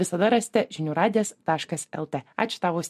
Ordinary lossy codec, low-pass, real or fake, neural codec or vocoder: MP3, 64 kbps; 14.4 kHz; real; none